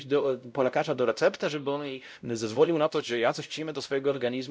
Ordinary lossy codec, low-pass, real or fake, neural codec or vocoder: none; none; fake; codec, 16 kHz, 0.5 kbps, X-Codec, WavLM features, trained on Multilingual LibriSpeech